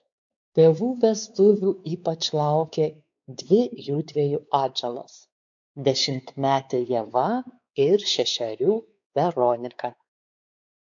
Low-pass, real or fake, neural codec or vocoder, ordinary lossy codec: 7.2 kHz; fake; codec, 16 kHz, 4 kbps, X-Codec, WavLM features, trained on Multilingual LibriSpeech; AAC, 64 kbps